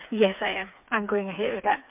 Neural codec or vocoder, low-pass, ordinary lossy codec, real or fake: codec, 16 kHz, 4 kbps, FreqCodec, smaller model; 3.6 kHz; MP3, 32 kbps; fake